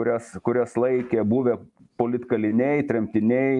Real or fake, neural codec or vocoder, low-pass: real; none; 10.8 kHz